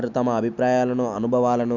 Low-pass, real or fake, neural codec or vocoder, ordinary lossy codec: 7.2 kHz; real; none; none